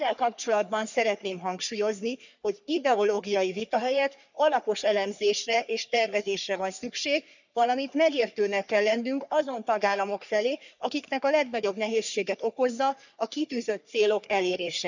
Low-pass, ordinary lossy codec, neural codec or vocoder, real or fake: 7.2 kHz; none; codec, 44.1 kHz, 3.4 kbps, Pupu-Codec; fake